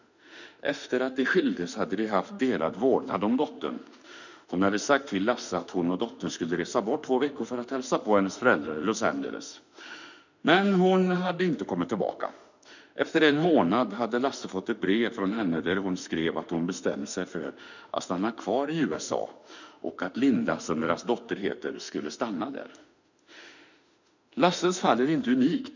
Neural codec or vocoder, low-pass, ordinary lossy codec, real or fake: autoencoder, 48 kHz, 32 numbers a frame, DAC-VAE, trained on Japanese speech; 7.2 kHz; none; fake